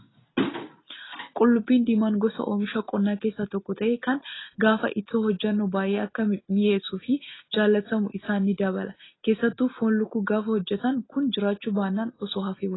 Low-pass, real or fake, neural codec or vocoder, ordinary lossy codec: 7.2 kHz; real; none; AAC, 16 kbps